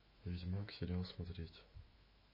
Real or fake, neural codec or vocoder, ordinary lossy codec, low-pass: fake; autoencoder, 48 kHz, 128 numbers a frame, DAC-VAE, trained on Japanese speech; MP3, 24 kbps; 5.4 kHz